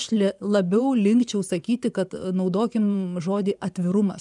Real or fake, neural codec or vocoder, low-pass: fake; vocoder, 24 kHz, 100 mel bands, Vocos; 10.8 kHz